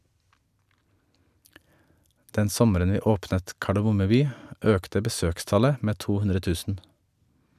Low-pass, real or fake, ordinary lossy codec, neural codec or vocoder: 14.4 kHz; real; none; none